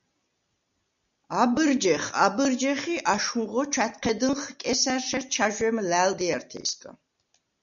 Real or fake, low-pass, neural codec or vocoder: real; 7.2 kHz; none